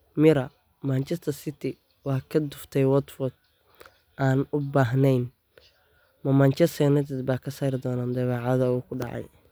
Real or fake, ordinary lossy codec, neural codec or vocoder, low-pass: real; none; none; none